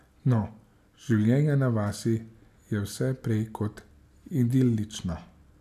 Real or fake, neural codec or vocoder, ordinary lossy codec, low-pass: real; none; AAC, 96 kbps; 14.4 kHz